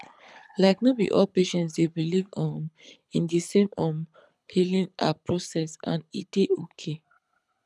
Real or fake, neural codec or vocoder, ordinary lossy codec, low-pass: fake; codec, 24 kHz, 6 kbps, HILCodec; none; none